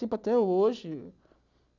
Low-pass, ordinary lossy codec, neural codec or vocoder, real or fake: 7.2 kHz; none; none; real